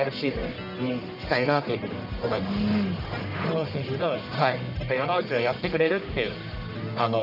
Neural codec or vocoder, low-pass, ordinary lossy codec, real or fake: codec, 44.1 kHz, 1.7 kbps, Pupu-Codec; 5.4 kHz; none; fake